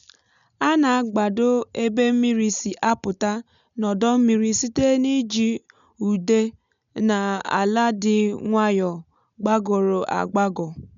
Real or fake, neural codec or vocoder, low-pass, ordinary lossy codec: real; none; 7.2 kHz; none